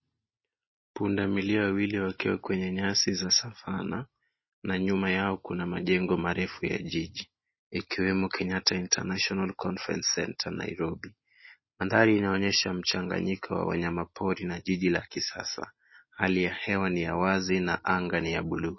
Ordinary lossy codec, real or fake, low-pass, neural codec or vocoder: MP3, 24 kbps; real; 7.2 kHz; none